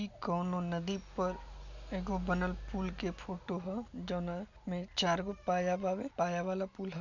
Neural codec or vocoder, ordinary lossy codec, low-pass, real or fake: none; none; 7.2 kHz; real